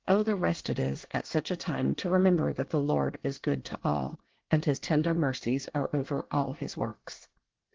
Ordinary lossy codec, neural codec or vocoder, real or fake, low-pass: Opus, 16 kbps; codec, 24 kHz, 1 kbps, SNAC; fake; 7.2 kHz